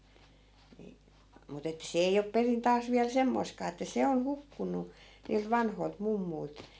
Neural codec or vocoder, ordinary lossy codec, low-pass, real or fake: none; none; none; real